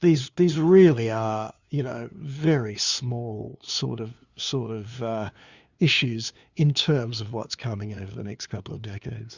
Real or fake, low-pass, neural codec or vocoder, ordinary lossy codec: fake; 7.2 kHz; codec, 16 kHz, 2 kbps, FunCodec, trained on LibriTTS, 25 frames a second; Opus, 64 kbps